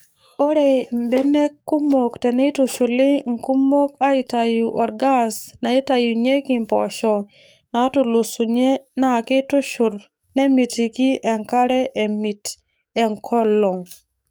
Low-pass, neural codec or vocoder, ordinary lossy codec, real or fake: none; codec, 44.1 kHz, 7.8 kbps, DAC; none; fake